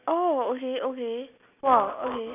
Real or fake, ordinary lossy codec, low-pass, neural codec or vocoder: real; none; 3.6 kHz; none